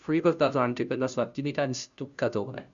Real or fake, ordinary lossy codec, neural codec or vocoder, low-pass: fake; Opus, 64 kbps; codec, 16 kHz, 0.5 kbps, FunCodec, trained on Chinese and English, 25 frames a second; 7.2 kHz